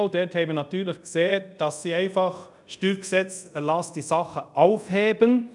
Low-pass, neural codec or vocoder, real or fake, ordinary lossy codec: 10.8 kHz; codec, 24 kHz, 0.5 kbps, DualCodec; fake; none